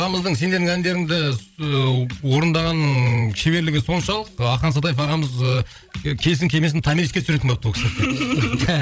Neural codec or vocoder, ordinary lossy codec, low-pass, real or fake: codec, 16 kHz, 16 kbps, FreqCodec, larger model; none; none; fake